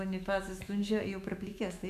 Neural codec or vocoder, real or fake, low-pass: autoencoder, 48 kHz, 128 numbers a frame, DAC-VAE, trained on Japanese speech; fake; 14.4 kHz